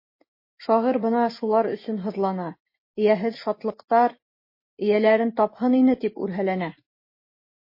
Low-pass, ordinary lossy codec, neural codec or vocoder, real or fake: 5.4 kHz; MP3, 24 kbps; none; real